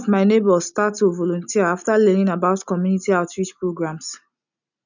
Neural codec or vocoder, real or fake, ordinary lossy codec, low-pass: none; real; none; 7.2 kHz